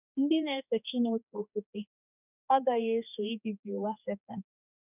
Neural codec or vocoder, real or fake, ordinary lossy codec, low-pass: codec, 16 kHz, 2 kbps, X-Codec, HuBERT features, trained on general audio; fake; none; 3.6 kHz